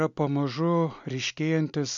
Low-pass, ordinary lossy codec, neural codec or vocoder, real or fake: 7.2 kHz; MP3, 64 kbps; none; real